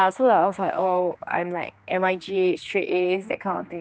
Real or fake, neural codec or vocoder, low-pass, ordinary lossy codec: fake; codec, 16 kHz, 2 kbps, X-Codec, HuBERT features, trained on general audio; none; none